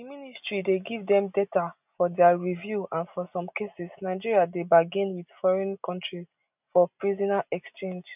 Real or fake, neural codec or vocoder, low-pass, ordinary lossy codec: real; none; 3.6 kHz; none